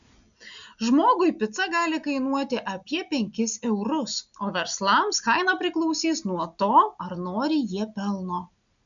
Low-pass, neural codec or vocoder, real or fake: 7.2 kHz; none; real